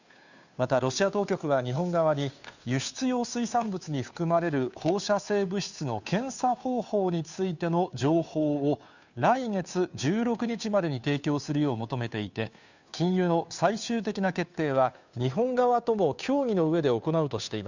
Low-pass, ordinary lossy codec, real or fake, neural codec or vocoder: 7.2 kHz; none; fake; codec, 16 kHz, 2 kbps, FunCodec, trained on Chinese and English, 25 frames a second